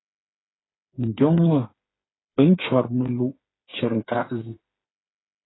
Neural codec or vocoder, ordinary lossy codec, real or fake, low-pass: codec, 16 kHz, 4 kbps, FreqCodec, smaller model; AAC, 16 kbps; fake; 7.2 kHz